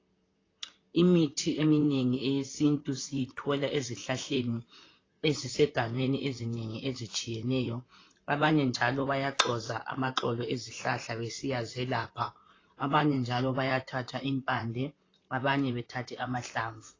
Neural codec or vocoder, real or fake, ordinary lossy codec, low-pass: vocoder, 44.1 kHz, 128 mel bands, Pupu-Vocoder; fake; AAC, 32 kbps; 7.2 kHz